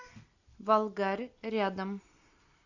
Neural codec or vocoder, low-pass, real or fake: none; 7.2 kHz; real